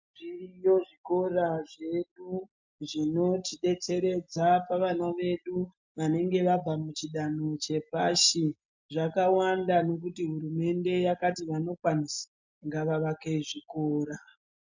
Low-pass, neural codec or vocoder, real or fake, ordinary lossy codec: 7.2 kHz; none; real; MP3, 64 kbps